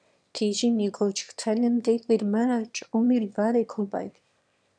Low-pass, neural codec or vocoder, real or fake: 9.9 kHz; autoencoder, 22.05 kHz, a latent of 192 numbers a frame, VITS, trained on one speaker; fake